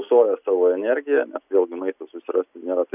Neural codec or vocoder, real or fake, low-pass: none; real; 3.6 kHz